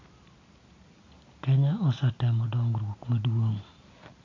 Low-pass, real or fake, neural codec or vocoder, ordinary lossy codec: 7.2 kHz; real; none; AAC, 32 kbps